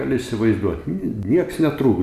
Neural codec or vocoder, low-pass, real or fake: none; 14.4 kHz; real